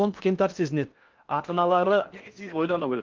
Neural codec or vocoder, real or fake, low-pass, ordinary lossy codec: codec, 16 kHz in and 24 kHz out, 0.6 kbps, FocalCodec, streaming, 2048 codes; fake; 7.2 kHz; Opus, 24 kbps